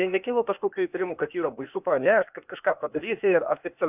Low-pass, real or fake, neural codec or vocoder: 3.6 kHz; fake; codec, 16 kHz, 0.8 kbps, ZipCodec